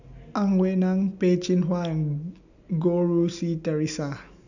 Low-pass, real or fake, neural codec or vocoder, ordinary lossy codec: 7.2 kHz; real; none; none